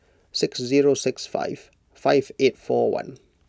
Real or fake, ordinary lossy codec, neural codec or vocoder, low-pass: real; none; none; none